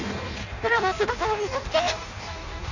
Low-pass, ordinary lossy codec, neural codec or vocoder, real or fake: 7.2 kHz; none; codec, 16 kHz in and 24 kHz out, 0.6 kbps, FireRedTTS-2 codec; fake